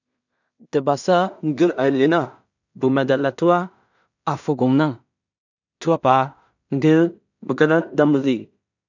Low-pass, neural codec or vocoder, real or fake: 7.2 kHz; codec, 16 kHz in and 24 kHz out, 0.4 kbps, LongCat-Audio-Codec, two codebook decoder; fake